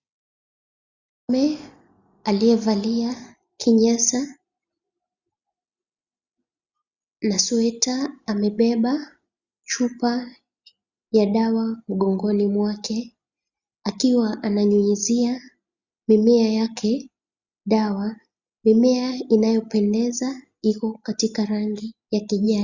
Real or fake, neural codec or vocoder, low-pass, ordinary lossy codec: real; none; 7.2 kHz; Opus, 64 kbps